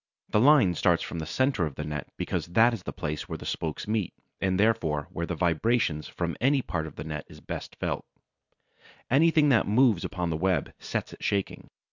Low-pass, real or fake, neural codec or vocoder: 7.2 kHz; real; none